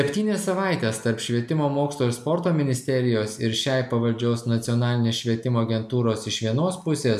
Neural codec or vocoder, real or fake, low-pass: none; real; 14.4 kHz